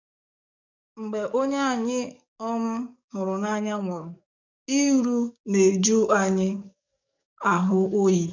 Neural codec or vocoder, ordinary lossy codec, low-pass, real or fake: codec, 44.1 kHz, 7.8 kbps, DAC; none; 7.2 kHz; fake